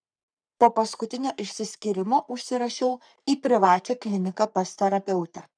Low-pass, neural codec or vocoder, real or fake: 9.9 kHz; codec, 44.1 kHz, 3.4 kbps, Pupu-Codec; fake